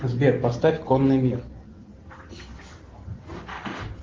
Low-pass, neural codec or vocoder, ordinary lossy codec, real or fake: 7.2 kHz; none; Opus, 16 kbps; real